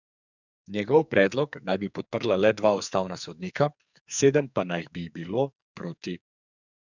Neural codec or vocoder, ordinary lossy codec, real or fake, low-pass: codec, 44.1 kHz, 2.6 kbps, SNAC; none; fake; 7.2 kHz